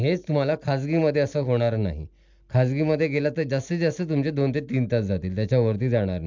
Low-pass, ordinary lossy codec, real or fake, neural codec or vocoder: 7.2 kHz; MP3, 64 kbps; real; none